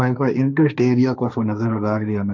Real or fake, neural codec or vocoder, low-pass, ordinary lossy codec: fake; codec, 16 kHz, 1.1 kbps, Voila-Tokenizer; 7.2 kHz; none